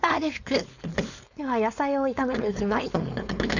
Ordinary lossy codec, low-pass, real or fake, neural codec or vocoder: none; 7.2 kHz; fake; codec, 16 kHz, 4.8 kbps, FACodec